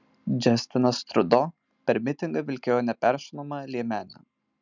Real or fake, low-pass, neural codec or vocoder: real; 7.2 kHz; none